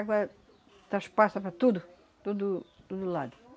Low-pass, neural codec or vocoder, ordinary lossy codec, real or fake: none; none; none; real